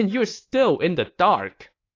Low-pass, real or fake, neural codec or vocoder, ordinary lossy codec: 7.2 kHz; fake; codec, 24 kHz, 3.1 kbps, DualCodec; AAC, 32 kbps